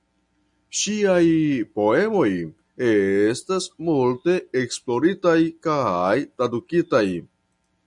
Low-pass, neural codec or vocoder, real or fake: 10.8 kHz; none; real